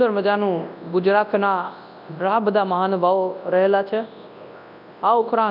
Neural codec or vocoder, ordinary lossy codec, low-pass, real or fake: codec, 24 kHz, 0.9 kbps, WavTokenizer, large speech release; none; 5.4 kHz; fake